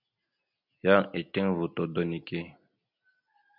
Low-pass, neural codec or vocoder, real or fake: 5.4 kHz; none; real